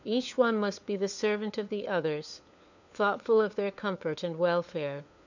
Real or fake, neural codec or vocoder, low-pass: fake; codec, 16 kHz, 4 kbps, FunCodec, trained on LibriTTS, 50 frames a second; 7.2 kHz